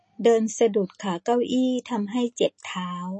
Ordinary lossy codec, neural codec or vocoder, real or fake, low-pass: MP3, 32 kbps; none; real; 10.8 kHz